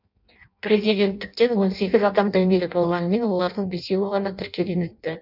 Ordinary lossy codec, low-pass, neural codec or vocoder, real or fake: none; 5.4 kHz; codec, 16 kHz in and 24 kHz out, 0.6 kbps, FireRedTTS-2 codec; fake